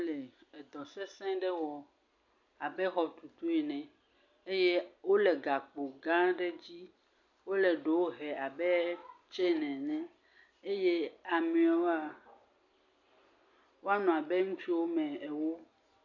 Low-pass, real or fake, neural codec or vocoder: 7.2 kHz; real; none